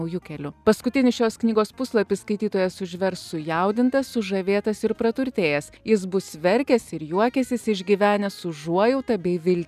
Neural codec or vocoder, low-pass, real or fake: none; 14.4 kHz; real